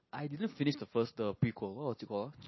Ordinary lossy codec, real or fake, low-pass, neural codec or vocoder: MP3, 24 kbps; real; 7.2 kHz; none